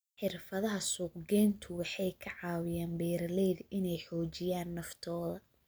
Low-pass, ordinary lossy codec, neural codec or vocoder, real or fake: none; none; none; real